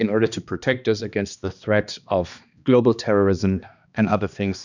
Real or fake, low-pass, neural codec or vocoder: fake; 7.2 kHz; codec, 16 kHz, 2 kbps, X-Codec, HuBERT features, trained on balanced general audio